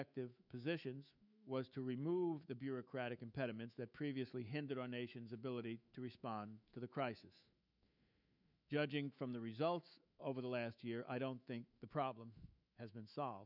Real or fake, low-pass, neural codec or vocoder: fake; 5.4 kHz; autoencoder, 48 kHz, 128 numbers a frame, DAC-VAE, trained on Japanese speech